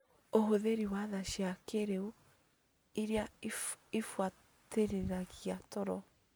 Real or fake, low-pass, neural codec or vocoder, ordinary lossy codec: real; none; none; none